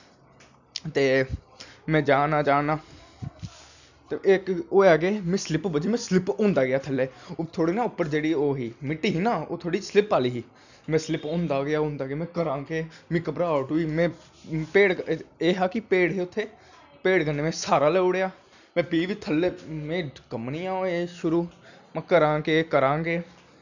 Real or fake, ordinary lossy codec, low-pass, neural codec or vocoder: real; none; 7.2 kHz; none